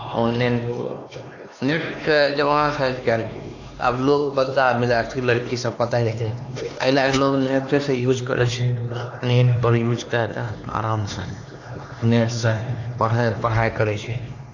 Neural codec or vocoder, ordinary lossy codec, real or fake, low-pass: codec, 16 kHz, 2 kbps, X-Codec, HuBERT features, trained on LibriSpeech; AAC, 48 kbps; fake; 7.2 kHz